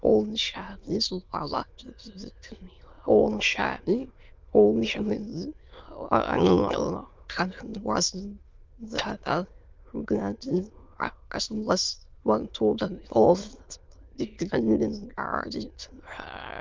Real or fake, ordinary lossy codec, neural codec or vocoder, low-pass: fake; Opus, 24 kbps; autoencoder, 22.05 kHz, a latent of 192 numbers a frame, VITS, trained on many speakers; 7.2 kHz